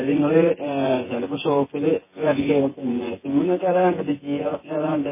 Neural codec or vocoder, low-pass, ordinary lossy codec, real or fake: vocoder, 24 kHz, 100 mel bands, Vocos; 3.6 kHz; MP3, 16 kbps; fake